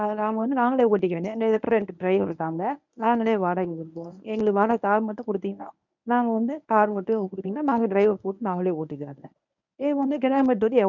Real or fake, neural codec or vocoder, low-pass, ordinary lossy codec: fake; codec, 24 kHz, 0.9 kbps, WavTokenizer, medium speech release version 1; 7.2 kHz; none